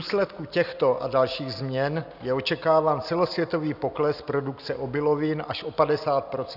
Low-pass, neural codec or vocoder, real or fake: 5.4 kHz; none; real